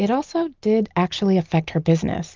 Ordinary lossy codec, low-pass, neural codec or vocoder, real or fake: Opus, 32 kbps; 7.2 kHz; none; real